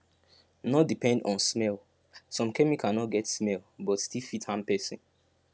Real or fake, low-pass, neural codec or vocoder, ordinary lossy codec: real; none; none; none